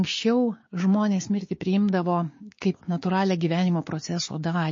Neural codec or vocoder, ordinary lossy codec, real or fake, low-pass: codec, 16 kHz, 6 kbps, DAC; MP3, 32 kbps; fake; 7.2 kHz